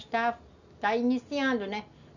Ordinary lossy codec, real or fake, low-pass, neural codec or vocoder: none; real; 7.2 kHz; none